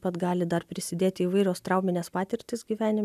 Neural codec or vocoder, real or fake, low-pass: none; real; 14.4 kHz